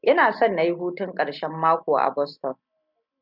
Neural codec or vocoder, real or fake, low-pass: none; real; 5.4 kHz